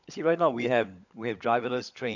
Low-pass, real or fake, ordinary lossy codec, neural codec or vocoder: 7.2 kHz; fake; none; codec, 16 kHz, 16 kbps, FunCodec, trained on LibriTTS, 50 frames a second